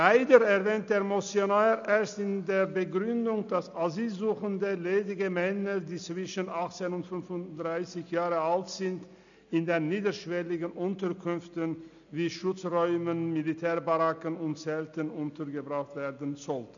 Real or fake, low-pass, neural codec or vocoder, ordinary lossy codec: real; 7.2 kHz; none; none